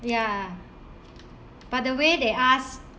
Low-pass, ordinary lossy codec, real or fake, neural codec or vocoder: none; none; real; none